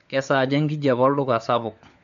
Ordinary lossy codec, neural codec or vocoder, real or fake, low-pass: none; codec, 16 kHz, 4 kbps, FunCodec, trained on LibriTTS, 50 frames a second; fake; 7.2 kHz